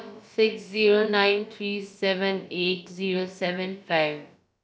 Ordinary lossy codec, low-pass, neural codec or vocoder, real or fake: none; none; codec, 16 kHz, about 1 kbps, DyCAST, with the encoder's durations; fake